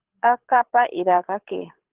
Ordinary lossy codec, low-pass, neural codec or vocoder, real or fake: Opus, 16 kbps; 3.6 kHz; codec, 24 kHz, 6 kbps, HILCodec; fake